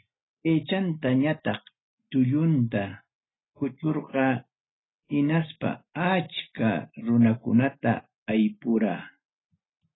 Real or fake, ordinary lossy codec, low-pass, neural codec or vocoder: real; AAC, 16 kbps; 7.2 kHz; none